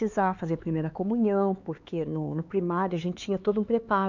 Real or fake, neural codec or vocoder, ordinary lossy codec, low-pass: fake; codec, 16 kHz, 4 kbps, X-Codec, HuBERT features, trained on LibriSpeech; MP3, 64 kbps; 7.2 kHz